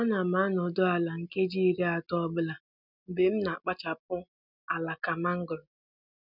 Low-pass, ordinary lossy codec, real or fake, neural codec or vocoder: 5.4 kHz; none; real; none